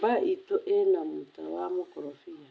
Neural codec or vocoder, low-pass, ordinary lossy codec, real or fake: none; none; none; real